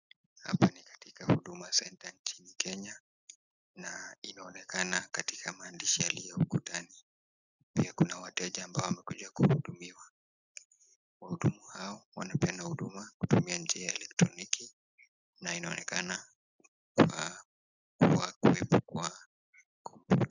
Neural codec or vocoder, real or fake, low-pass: none; real; 7.2 kHz